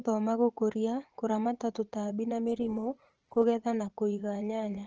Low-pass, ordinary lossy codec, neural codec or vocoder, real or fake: 7.2 kHz; Opus, 16 kbps; vocoder, 44.1 kHz, 80 mel bands, Vocos; fake